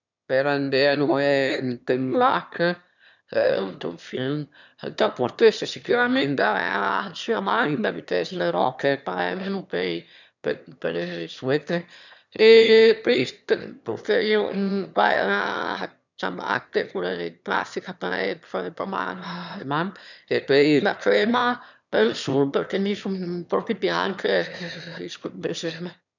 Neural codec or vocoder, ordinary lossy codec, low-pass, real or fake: autoencoder, 22.05 kHz, a latent of 192 numbers a frame, VITS, trained on one speaker; none; 7.2 kHz; fake